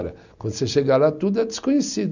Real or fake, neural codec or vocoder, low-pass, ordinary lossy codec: real; none; 7.2 kHz; none